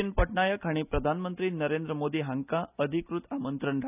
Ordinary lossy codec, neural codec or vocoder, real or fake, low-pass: none; none; real; 3.6 kHz